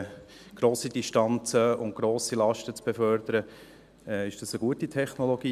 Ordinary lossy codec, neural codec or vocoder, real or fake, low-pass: none; none; real; 14.4 kHz